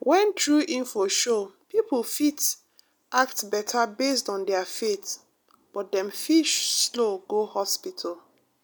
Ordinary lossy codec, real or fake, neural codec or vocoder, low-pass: none; real; none; none